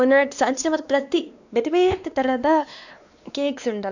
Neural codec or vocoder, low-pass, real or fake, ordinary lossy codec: codec, 16 kHz, 2 kbps, X-Codec, WavLM features, trained on Multilingual LibriSpeech; 7.2 kHz; fake; none